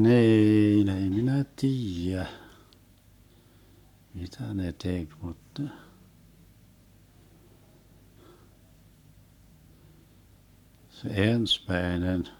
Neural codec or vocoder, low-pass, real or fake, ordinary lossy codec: codec, 44.1 kHz, 7.8 kbps, Pupu-Codec; 19.8 kHz; fake; none